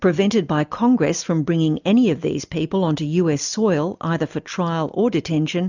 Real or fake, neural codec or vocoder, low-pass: real; none; 7.2 kHz